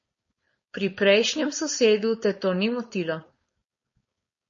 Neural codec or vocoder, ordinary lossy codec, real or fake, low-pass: codec, 16 kHz, 4.8 kbps, FACodec; MP3, 32 kbps; fake; 7.2 kHz